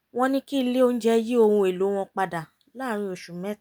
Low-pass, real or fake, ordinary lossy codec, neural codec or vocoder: none; real; none; none